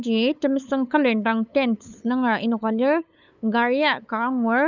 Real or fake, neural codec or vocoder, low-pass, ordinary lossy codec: fake; codec, 16 kHz, 8 kbps, FunCodec, trained on LibriTTS, 25 frames a second; 7.2 kHz; none